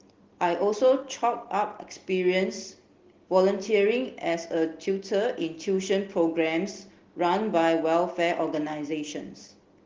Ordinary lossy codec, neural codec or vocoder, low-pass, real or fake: Opus, 16 kbps; none; 7.2 kHz; real